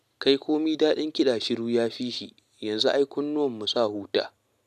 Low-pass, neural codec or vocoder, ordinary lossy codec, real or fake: 14.4 kHz; none; MP3, 96 kbps; real